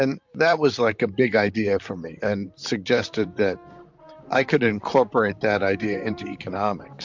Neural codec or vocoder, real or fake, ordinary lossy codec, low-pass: vocoder, 44.1 kHz, 128 mel bands, Pupu-Vocoder; fake; MP3, 64 kbps; 7.2 kHz